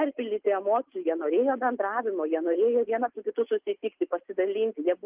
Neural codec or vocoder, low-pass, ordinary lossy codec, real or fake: none; 3.6 kHz; Opus, 32 kbps; real